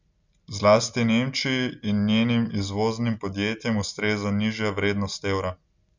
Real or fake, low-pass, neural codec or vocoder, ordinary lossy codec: real; 7.2 kHz; none; Opus, 64 kbps